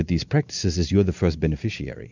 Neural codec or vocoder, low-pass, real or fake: none; 7.2 kHz; real